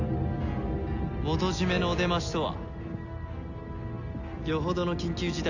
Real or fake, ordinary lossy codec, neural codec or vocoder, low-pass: real; none; none; 7.2 kHz